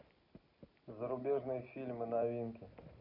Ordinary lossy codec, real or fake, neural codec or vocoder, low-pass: none; real; none; 5.4 kHz